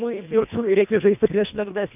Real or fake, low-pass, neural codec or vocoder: fake; 3.6 kHz; codec, 24 kHz, 1.5 kbps, HILCodec